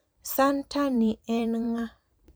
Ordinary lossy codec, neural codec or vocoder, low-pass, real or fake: none; vocoder, 44.1 kHz, 128 mel bands every 512 samples, BigVGAN v2; none; fake